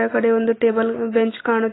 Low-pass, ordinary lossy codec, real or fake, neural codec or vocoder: 7.2 kHz; AAC, 16 kbps; real; none